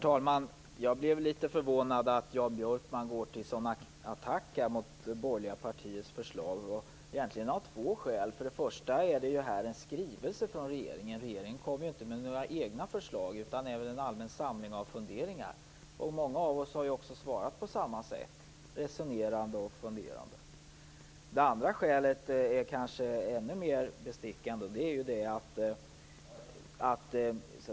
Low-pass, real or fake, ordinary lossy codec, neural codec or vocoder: none; real; none; none